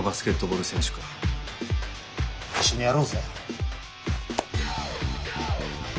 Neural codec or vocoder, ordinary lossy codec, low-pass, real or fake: none; none; none; real